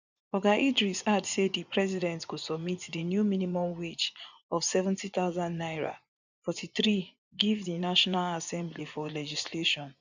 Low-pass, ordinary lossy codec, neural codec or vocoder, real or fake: 7.2 kHz; none; vocoder, 24 kHz, 100 mel bands, Vocos; fake